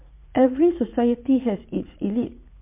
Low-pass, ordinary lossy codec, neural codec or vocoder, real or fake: 3.6 kHz; MP3, 32 kbps; vocoder, 44.1 kHz, 80 mel bands, Vocos; fake